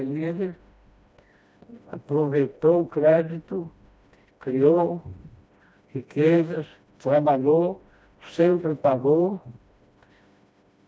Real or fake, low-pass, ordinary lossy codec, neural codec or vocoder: fake; none; none; codec, 16 kHz, 1 kbps, FreqCodec, smaller model